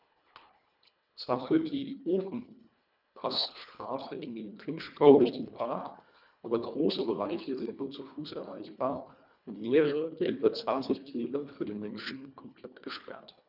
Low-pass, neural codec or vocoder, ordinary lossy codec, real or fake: 5.4 kHz; codec, 24 kHz, 1.5 kbps, HILCodec; none; fake